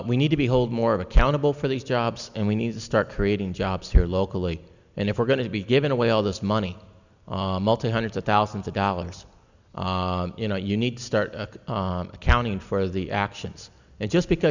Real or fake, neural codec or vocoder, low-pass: real; none; 7.2 kHz